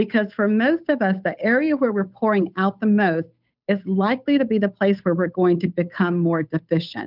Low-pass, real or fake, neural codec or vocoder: 5.4 kHz; fake; codec, 16 kHz, 8 kbps, FunCodec, trained on Chinese and English, 25 frames a second